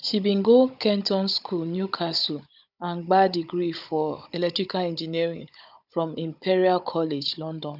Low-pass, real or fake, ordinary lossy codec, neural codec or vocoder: 5.4 kHz; fake; none; codec, 16 kHz, 16 kbps, FunCodec, trained on Chinese and English, 50 frames a second